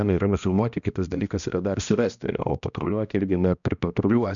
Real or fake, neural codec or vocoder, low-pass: fake; codec, 16 kHz, 1 kbps, X-Codec, HuBERT features, trained on balanced general audio; 7.2 kHz